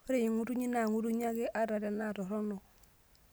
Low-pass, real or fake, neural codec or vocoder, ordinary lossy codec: none; real; none; none